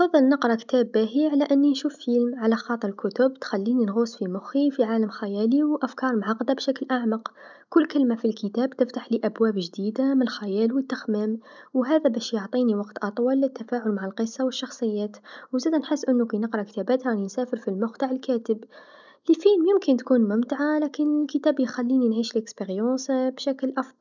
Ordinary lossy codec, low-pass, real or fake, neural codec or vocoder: none; 7.2 kHz; real; none